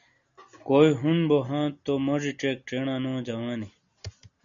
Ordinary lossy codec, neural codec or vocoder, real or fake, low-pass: MP3, 64 kbps; none; real; 7.2 kHz